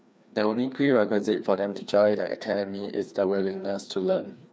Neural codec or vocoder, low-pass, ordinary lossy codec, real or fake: codec, 16 kHz, 2 kbps, FreqCodec, larger model; none; none; fake